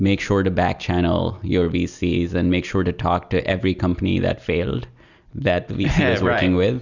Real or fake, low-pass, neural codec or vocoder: real; 7.2 kHz; none